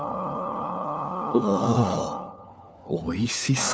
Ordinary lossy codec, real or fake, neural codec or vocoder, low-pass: none; fake; codec, 16 kHz, 4 kbps, FunCodec, trained on LibriTTS, 50 frames a second; none